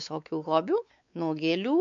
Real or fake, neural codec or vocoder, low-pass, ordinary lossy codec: fake; codec, 16 kHz, 4 kbps, X-Codec, WavLM features, trained on Multilingual LibriSpeech; 7.2 kHz; MP3, 64 kbps